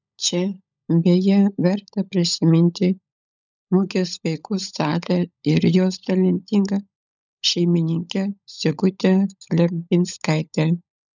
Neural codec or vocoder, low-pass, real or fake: codec, 16 kHz, 16 kbps, FunCodec, trained on LibriTTS, 50 frames a second; 7.2 kHz; fake